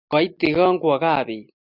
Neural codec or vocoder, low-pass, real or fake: none; 5.4 kHz; real